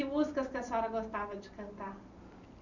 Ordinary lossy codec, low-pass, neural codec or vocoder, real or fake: none; 7.2 kHz; none; real